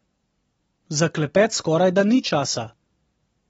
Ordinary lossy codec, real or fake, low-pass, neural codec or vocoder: AAC, 24 kbps; real; 19.8 kHz; none